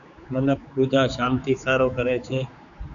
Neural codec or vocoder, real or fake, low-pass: codec, 16 kHz, 4 kbps, X-Codec, HuBERT features, trained on general audio; fake; 7.2 kHz